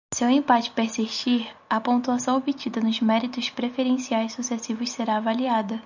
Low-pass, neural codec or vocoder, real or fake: 7.2 kHz; none; real